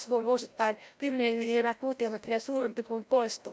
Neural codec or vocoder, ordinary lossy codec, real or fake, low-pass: codec, 16 kHz, 0.5 kbps, FreqCodec, larger model; none; fake; none